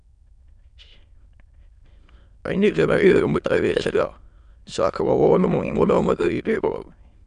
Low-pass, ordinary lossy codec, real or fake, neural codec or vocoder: 9.9 kHz; none; fake; autoencoder, 22.05 kHz, a latent of 192 numbers a frame, VITS, trained on many speakers